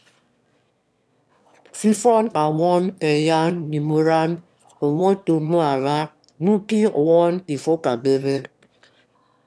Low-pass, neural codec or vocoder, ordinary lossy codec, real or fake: none; autoencoder, 22.05 kHz, a latent of 192 numbers a frame, VITS, trained on one speaker; none; fake